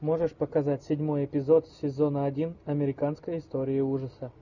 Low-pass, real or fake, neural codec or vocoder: 7.2 kHz; real; none